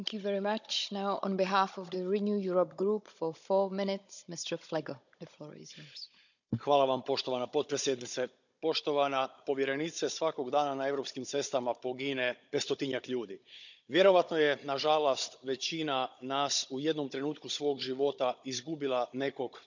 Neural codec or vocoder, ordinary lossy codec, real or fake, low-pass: codec, 16 kHz, 16 kbps, FunCodec, trained on Chinese and English, 50 frames a second; none; fake; 7.2 kHz